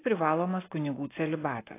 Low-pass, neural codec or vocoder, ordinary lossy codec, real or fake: 3.6 kHz; none; AAC, 16 kbps; real